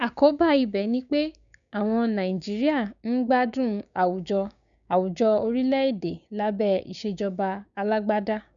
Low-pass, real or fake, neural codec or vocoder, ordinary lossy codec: 7.2 kHz; fake; codec, 16 kHz, 6 kbps, DAC; none